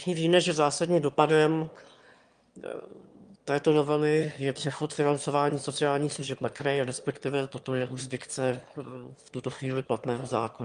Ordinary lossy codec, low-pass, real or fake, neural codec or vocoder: Opus, 32 kbps; 9.9 kHz; fake; autoencoder, 22.05 kHz, a latent of 192 numbers a frame, VITS, trained on one speaker